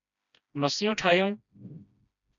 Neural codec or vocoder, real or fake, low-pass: codec, 16 kHz, 1 kbps, FreqCodec, smaller model; fake; 7.2 kHz